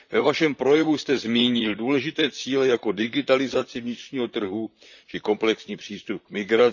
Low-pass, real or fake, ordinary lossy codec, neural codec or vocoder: 7.2 kHz; fake; none; vocoder, 44.1 kHz, 128 mel bands, Pupu-Vocoder